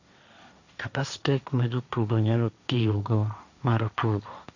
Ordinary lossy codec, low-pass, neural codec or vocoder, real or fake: none; 7.2 kHz; codec, 16 kHz, 1.1 kbps, Voila-Tokenizer; fake